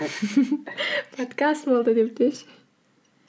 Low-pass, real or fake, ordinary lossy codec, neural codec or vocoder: none; real; none; none